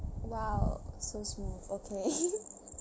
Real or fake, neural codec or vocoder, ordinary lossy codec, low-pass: real; none; none; none